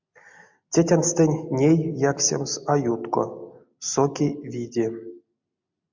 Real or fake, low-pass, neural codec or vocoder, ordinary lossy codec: real; 7.2 kHz; none; MP3, 64 kbps